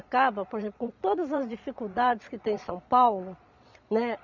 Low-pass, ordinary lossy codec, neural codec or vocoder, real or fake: 7.2 kHz; none; vocoder, 22.05 kHz, 80 mel bands, Vocos; fake